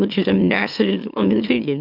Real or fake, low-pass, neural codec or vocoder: fake; 5.4 kHz; autoencoder, 44.1 kHz, a latent of 192 numbers a frame, MeloTTS